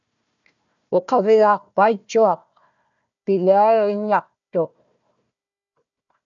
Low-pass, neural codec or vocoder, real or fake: 7.2 kHz; codec, 16 kHz, 1 kbps, FunCodec, trained on Chinese and English, 50 frames a second; fake